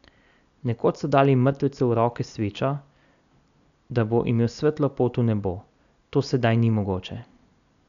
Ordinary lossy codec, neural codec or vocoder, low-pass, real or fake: none; none; 7.2 kHz; real